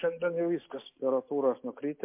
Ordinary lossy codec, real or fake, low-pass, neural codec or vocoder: MP3, 24 kbps; real; 3.6 kHz; none